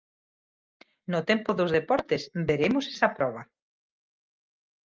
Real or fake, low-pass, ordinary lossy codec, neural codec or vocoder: real; 7.2 kHz; Opus, 32 kbps; none